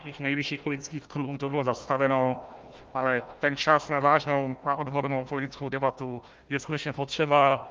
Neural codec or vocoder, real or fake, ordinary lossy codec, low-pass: codec, 16 kHz, 1 kbps, FunCodec, trained on Chinese and English, 50 frames a second; fake; Opus, 32 kbps; 7.2 kHz